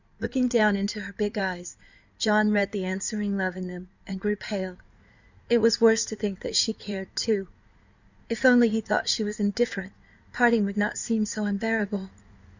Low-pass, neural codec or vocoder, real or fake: 7.2 kHz; codec, 16 kHz in and 24 kHz out, 2.2 kbps, FireRedTTS-2 codec; fake